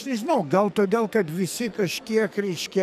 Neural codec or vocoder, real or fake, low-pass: codec, 44.1 kHz, 2.6 kbps, SNAC; fake; 14.4 kHz